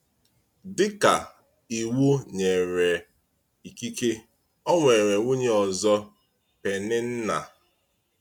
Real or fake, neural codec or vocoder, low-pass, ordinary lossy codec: real; none; 19.8 kHz; none